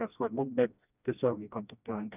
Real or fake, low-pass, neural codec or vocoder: fake; 3.6 kHz; codec, 16 kHz, 1 kbps, FreqCodec, smaller model